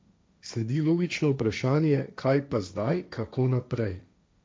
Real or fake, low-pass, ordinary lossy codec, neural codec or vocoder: fake; none; none; codec, 16 kHz, 1.1 kbps, Voila-Tokenizer